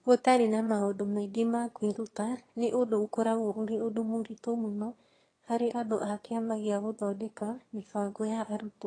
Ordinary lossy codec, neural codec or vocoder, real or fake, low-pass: AAC, 32 kbps; autoencoder, 22.05 kHz, a latent of 192 numbers a frame, VITS, trained on one speaker; fake; 9.9 kHz